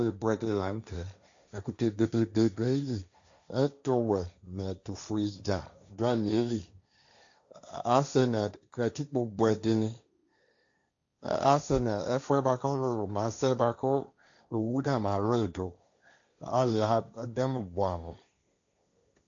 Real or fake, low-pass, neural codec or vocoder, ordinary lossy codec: fake; 7.2 kHz; codec, 16 kHz, 1.1 kbps, Voila-Tokenizer; AAC, 64 kbps